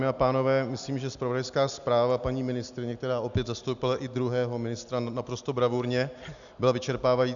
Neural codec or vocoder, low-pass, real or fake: none; 7.2 kHz; real